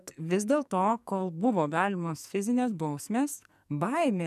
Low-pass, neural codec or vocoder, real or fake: 14.4 kHz; codec, 44.1 kHz, 2.6 kbps, SNAC; fake